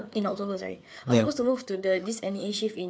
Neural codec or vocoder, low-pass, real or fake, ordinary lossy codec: codec, 16 kHz, 4 kbps, FreqCodec, larger model; none; fake; none